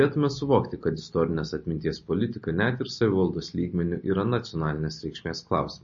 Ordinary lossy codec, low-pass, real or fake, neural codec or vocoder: MP3, 32 kbps; 7.2 kHz; real; none